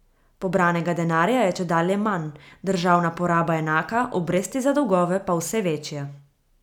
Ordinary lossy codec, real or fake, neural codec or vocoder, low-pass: none; real; none; 19.8 kHz